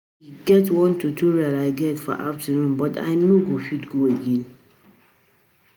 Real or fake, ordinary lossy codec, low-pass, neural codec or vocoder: real; none; none; none